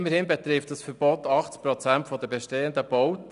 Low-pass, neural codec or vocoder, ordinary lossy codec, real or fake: 10.8 kHz; none; none; real